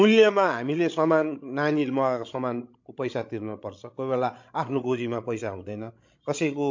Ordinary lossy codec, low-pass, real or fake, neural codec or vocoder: MP3, 48 kbps; 7.2 kHz; fake; codec, 16 kHz, 16 kbps, FreqCodec, larger model